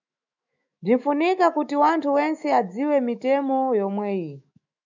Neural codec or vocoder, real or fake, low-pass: autoencoder, 48 kHz, 128 numbers a frame, DAC-VAE, trained on Japanese speech; fake; 7.2 kHz